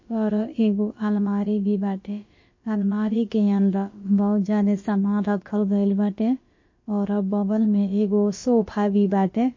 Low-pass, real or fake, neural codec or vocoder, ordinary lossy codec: 7.2 kHz; fake; codec, 16 kHz, about 1 kbps, DyCAST, with the encoder's durations; MP3, 32 kbps